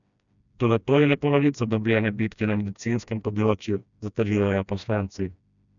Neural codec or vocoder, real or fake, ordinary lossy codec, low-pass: codec, 16 kHz, 1 kbps, FreqCodec, smaller model; fake; none; 7.2 kHz